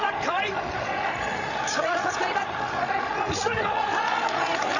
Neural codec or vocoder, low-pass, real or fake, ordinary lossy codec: codec, 16 kHz, 16 kbps, FreqCodec, larger model; 7.2 kHz; fake; none